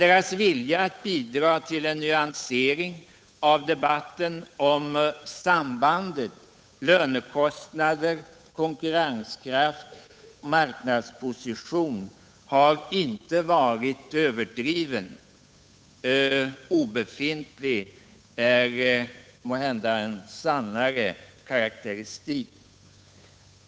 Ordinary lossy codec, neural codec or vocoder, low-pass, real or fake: none; codec, 16 kHz, 8 kbps, FunCodec, trained on Chinese and English, 25 frames a second; none; fake